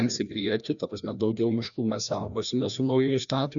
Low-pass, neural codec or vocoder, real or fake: 7.2 kHz; codec, 16 kHz, 1 kbps, FreqCodec, larger model; fake